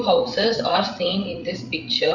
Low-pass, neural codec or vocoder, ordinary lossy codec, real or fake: 7.2 kHz; codec, 16 kHz, 8 kbps, FreqCodec, larger model; none; fake